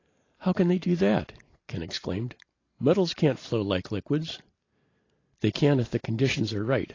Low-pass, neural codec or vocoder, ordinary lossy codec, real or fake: 7.2 kHz; none; AAC, 32 kbps; real